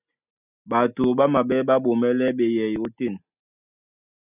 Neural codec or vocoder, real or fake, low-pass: none; real; 3.6 kHz